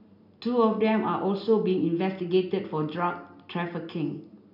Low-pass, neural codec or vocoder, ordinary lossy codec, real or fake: 5.4 kHz; none; none; real